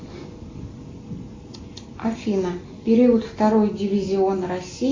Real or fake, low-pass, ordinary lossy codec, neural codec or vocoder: real; 7.2 kHz; AAC, 32 kbps; none